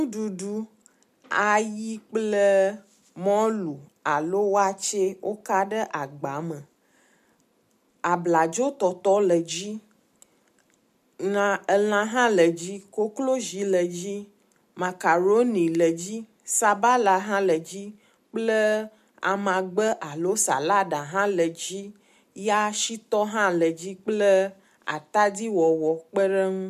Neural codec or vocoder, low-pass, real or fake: none; 14.4 kHz; real